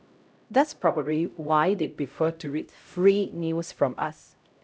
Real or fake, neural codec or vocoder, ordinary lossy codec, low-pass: fake; codec, 16 kHz, 0.5 kbps, X-Codec, HuBERT features, trained on LibriSpeech; none; none